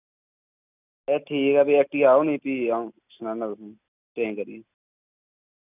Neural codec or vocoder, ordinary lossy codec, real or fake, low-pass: none; none; real; 3.6 kHz